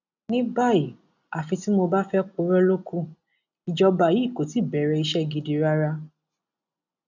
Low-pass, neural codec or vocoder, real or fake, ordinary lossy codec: 7.2 kHz; none; real; none